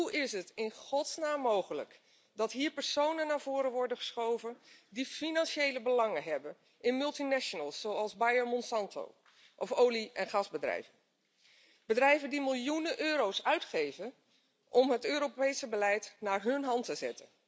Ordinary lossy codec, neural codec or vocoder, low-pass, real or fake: none; none; none; real